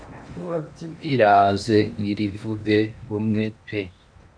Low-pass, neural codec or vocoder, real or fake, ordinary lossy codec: 9.9 kHz; codec, 16 kHz in and 24 kHz out, 0.8 kbps, FocalCodec, streaming, 65536 codes; fake; MP3, 64 kbps